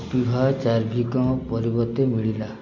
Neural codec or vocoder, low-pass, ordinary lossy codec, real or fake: none; 7.2 kHz; MP3, 64 kbps; real